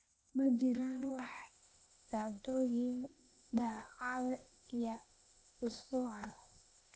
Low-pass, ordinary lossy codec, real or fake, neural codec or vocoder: none; none; fake; codec, 16 kHz, 0.8 kbps, ZipCodec